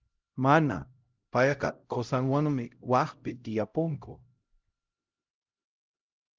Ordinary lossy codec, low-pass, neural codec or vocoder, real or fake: Opus, 24 kbps; 7.2 kHz; codec, 16 kHz, 0.5 kbps, X-Codec, HuBERT features, trained on LibriSpeech; fake